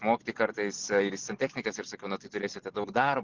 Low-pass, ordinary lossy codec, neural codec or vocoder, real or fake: 7.2 kHz; Opus, 16 kbps; none; real